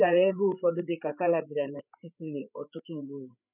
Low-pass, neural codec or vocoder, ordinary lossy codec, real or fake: 3.6 kHz; codec, 16 kHz, 8 kbps, FreqCodec, larger model; none; fake